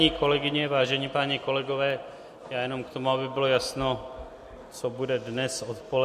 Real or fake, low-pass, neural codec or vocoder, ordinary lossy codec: real; 14.4 kHz; none; MP3, 64 kbps